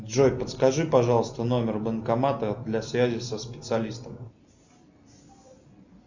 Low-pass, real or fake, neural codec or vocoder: 7.2 kHz; real; none